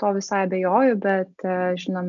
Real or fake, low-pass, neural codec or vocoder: real; 7.2 kHz; none